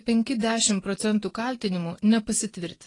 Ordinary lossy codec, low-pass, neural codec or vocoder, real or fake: AAC, 32 kbps; 10.8 kHz; vocoder, 24 kHz, 100 mel bands, Vocos; fake